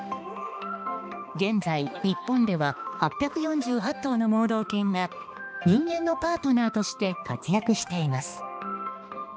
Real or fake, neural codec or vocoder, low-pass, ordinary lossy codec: fake; codec, 16 kHz, 2 kbps, X-Codec, HuBERT features, trained on balanced general audio; none; none